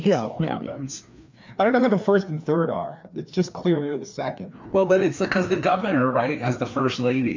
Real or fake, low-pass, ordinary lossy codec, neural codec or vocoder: fake; 7.2 kHz; MP3, 64 kbps; codec, 16 kHz, 2 kbps, FreqCodec, larger model